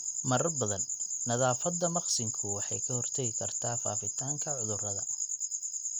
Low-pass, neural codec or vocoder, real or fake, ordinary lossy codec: 19.8 kHz; none; real; none